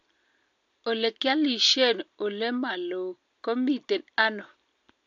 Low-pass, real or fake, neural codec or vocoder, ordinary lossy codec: 7.2 kHz; real; none; AAC, 64 kbps